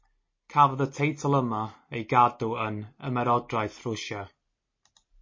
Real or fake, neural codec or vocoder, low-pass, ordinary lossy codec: real; none; 7.2 kHz; MP3, 32 kbps